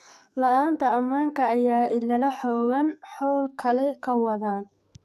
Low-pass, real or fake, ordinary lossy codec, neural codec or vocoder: 14.4 kHz; fake; none; codec, 44.1 kHz, 2.6 kbps, SNAC